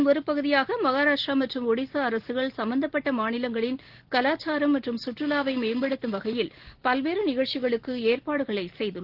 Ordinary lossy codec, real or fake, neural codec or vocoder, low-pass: Opus, 16 kbps; real; none; 5.4 kHz